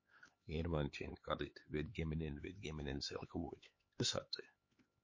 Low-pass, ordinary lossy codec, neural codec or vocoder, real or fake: 7.2 kHz; MP3, 32 kbps; codec, 16 kHz, 4 kbps, X-Codec, HuBERT features, trained on LibriSpeech; fake